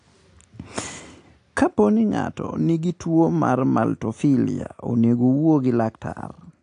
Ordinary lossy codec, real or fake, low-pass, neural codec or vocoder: AAC, 48 kbps; real; 9.9 kHz; none